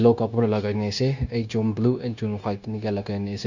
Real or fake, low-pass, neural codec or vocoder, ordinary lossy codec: fake; 7.2 kHz; codec, 16 kHz, 0.9 kbps, LongCat-Audio-Codec; none